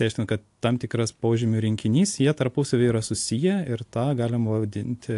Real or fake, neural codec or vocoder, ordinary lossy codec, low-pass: real; none; AAC, 64 kbps; 10.8 kHz